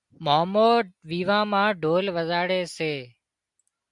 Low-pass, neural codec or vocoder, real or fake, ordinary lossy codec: 10.8 kHz; none; real; MP3, 96 kbps